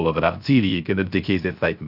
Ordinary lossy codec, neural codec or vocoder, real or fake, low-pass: none; codec, 16 kHz, 0.3 kbps, FocalCodec; fake; 5.4 kHz